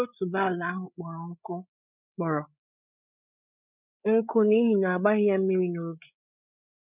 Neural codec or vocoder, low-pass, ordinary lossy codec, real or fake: codec, 16 kHz, 8 kbps, FreqCodec, larger model; 3.6 kHz; none; fake